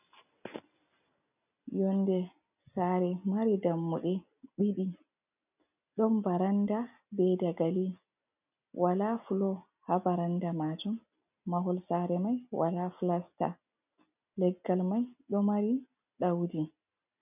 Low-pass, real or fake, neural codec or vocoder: 3.6 kHz; real; none